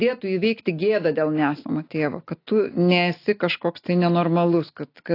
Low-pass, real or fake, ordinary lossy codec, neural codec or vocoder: 5.4 kHz; real; AAC, 32 kbps; none